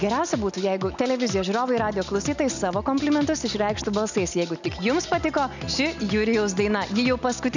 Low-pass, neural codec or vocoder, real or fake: 7.2 kHz; none; real